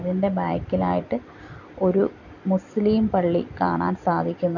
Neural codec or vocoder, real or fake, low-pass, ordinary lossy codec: vocoder, 44.1 kHz, 128 mel bands every 256 samples, BigVGAN v2; fake; 7.2 kHz; none